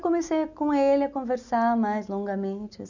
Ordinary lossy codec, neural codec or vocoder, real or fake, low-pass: none; none; real; 7.2 kHz